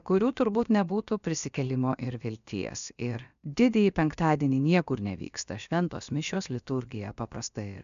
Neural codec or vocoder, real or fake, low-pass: codec, 16 kHz, about 1 kbps, DyCAST, with the encoder's durations; fake; 7.2 kHz